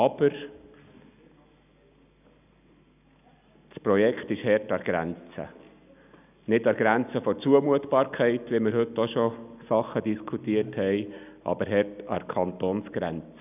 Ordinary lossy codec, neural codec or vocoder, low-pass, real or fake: none; none; 3.6 kHz; real